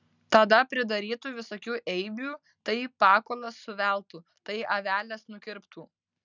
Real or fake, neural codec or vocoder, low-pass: real; none; 7.2 kHz